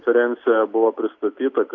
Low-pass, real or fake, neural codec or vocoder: 7.2 kHz; real; none